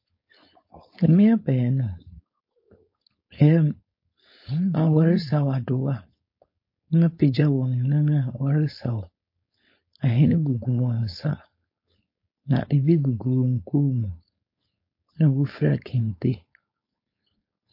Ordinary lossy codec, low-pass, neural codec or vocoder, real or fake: MP3, 32 kbps; 5.4 kHz; codec, 16 kHz, 4.8 kbps, FACodec; fake